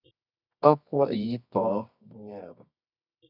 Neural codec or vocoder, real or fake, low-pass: codec, 24 kHz, 0.9 kbps, WavTokenizer, medium music audio release; fake; 5.4 kHz